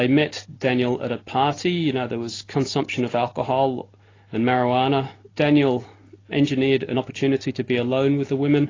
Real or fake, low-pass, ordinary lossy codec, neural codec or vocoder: real; 7.2 kHz; AAC, 32 kbps; none